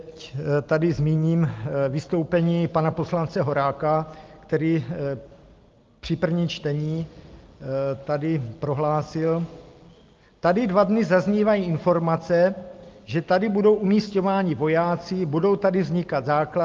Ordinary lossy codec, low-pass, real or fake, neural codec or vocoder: Opus, 32 kbps; 7.2 kHz; real; none